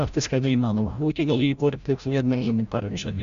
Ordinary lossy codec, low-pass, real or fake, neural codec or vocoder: Opus, 64 kbps; 7.2 kHz; fake; codec, 16 kHz, 0.5 kbps, FreqCodec, larger model